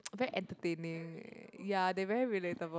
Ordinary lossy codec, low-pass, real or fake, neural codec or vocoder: none; none; real; none